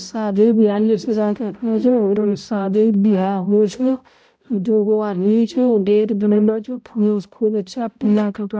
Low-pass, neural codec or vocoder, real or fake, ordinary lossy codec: none; codec, 16 kHz, 0.5 kbps, X-Codec, HuBERT features, trained on balanced general audio; fake; none